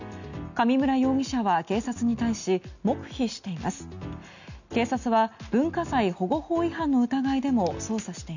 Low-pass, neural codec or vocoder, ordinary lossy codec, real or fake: 7.2 kHz; none; none; real